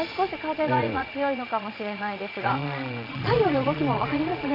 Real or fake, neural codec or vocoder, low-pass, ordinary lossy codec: fake; vocoder, 22.05 kHz, 80 mel bands, Vocos; 5.4 kHz; none